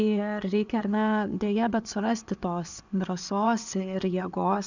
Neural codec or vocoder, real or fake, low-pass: vocoder, 24 kHz, 100 mel bands, Vocos; fake; 7.2 kHz